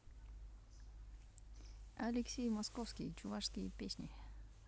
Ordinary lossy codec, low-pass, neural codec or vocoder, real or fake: none; none; none; real